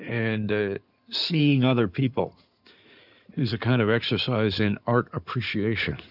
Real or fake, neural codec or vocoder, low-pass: fake; codec, 16 kHz in and 24 kHz out, 2.2 kbps, FireRedTTS-2 codec; 5.4 kHz